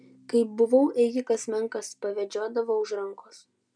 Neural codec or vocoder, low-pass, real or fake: none; 9.9 kHz; real